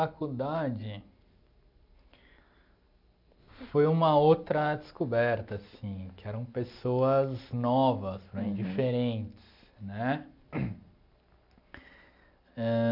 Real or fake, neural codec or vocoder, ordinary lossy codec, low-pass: real; none; none; 5.4 kHz